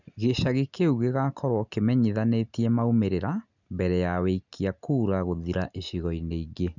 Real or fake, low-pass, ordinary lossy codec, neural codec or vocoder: real; 7.2 kHz; none; none